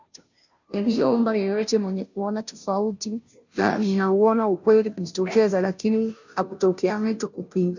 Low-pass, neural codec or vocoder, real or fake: 7.2 kHz; codec, 16 kHz, 0.5 kbps, FunCodec, trained on Chinese and English, 25 frames a second; fake